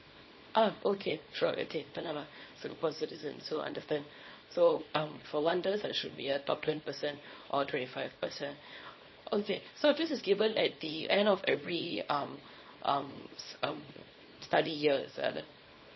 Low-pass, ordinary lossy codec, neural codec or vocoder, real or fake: 7.2 kHz; MP3, 24 kbps; codec, 24 kHz, 0.9 kbps, WavTokenizer, small release; fake